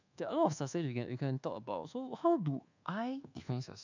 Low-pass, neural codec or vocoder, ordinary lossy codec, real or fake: 7.2 kHz; codec, 24 kHz, 1.2 kbps, DualCodec; none; fake